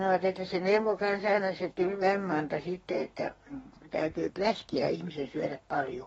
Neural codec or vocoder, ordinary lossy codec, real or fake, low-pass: codec, 32 kHz, 1.9 kbps, SNAC; AAC, 24 kbps; fake; 14.4 kHz